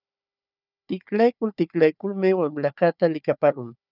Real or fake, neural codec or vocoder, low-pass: fake; codec, 16 kHz, 4 kbps, FunCodec, trained on Chinese and English, 50 frames a second; 5.4 kHz